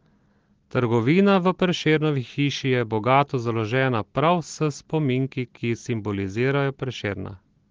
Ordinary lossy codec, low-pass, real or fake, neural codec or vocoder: Opus, 16 kbps; 7.2 kHz; real; none